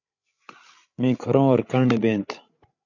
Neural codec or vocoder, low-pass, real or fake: codec, 16 kHz, 8 kbps, FreqCodec, larger model; 7.2 kHz; fake